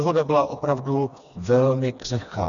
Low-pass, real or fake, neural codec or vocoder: 7.2 kHz; fake; codec, 16 kHz, 2 kbps, FreqCodec, smaller model